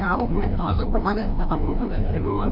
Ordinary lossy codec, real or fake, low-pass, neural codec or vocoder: none; fake; 5.4 kHz; codec, 16 kHz, 1 kbps, FreqCodec, larger model